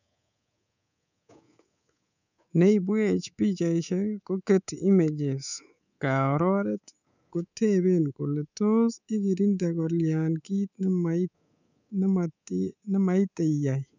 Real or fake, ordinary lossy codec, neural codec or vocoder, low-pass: fake; none; codec, 24 kHz, 3.1 kbps, DualCodec; 7.2 kHz